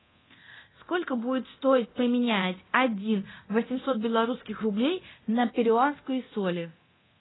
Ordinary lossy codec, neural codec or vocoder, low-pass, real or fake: AAC, 16 kbps; codec, 24 kHz, 0.9 kbps, DualCodec; 7.2 kHz; fake